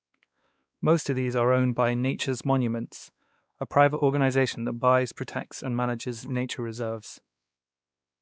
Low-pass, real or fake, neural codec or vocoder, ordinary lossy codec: none; fake; codec, 16 kHz, 2 kbps, X-Codec, WavLM features, trained on Multilingual LibriSpeech; none